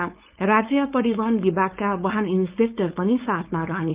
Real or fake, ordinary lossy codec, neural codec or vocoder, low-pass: fake; Opus, 32 kbps; codec, 16 kHz, 4.8 kbps, FACodec; 3.6 kHz